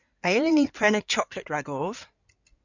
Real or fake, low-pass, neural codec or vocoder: fake; 7.2 kHz; codec, 16 kHz in and 24 kHz out, 2.2 kbps, FireRedTTS-2 codec